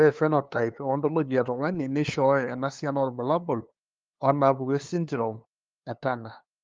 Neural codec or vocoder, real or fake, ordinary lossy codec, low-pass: codec, 16 kHz, 2 kbps, FunCodec, trained on LibriTTS, 25 frames a second; fake; Opus, 32 kbps; 7.2 kHz